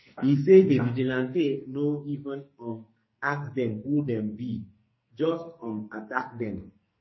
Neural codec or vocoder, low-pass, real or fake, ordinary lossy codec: codec, 44.1 kHz, 2.6 kbps, SNAC; 7.2 kHz; fake; MP3, 24 kbps